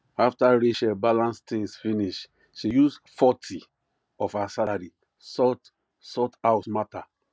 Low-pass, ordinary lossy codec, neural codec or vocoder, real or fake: none; none; none; real